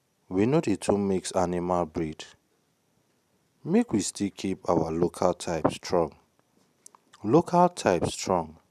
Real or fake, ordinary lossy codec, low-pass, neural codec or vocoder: real; none; 14.4 kHz; none